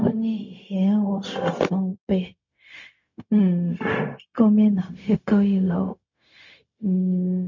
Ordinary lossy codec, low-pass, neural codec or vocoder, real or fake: MP3, 48 kbps; 7.2 kHz; codec, 16 kHz, 0.4 kbps, LongCat-Audio-Codec; fake